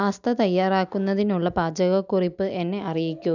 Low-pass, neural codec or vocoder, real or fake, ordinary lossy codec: 7.2 kHz; none; real; none